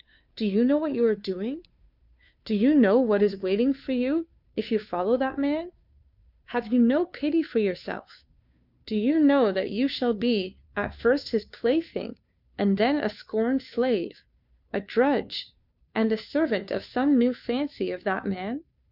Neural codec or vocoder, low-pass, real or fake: codec, 16 kHz, 2 kbps, FunCodec, trained on Chinese and English, 25 frames a second; 5.4 kHz; fake